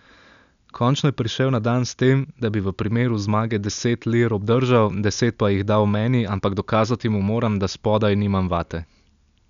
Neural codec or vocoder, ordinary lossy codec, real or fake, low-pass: none; none; real; 7.2 kHz